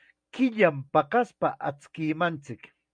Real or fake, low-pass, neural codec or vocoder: real; 9.9 kHz; none